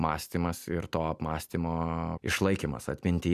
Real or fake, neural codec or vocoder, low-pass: real; none; 14.4 kHz